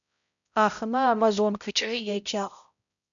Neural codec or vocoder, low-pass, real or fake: codec, 16 kHz, 0.5 kbps, X-Codec, HuBERT features, trained on balanced general audio; 7.2 kHz; fake